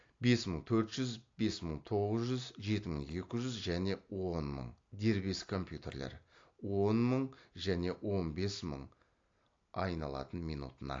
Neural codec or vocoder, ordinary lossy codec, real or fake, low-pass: none; AAC, 48 kbps; real; 7.2 kHz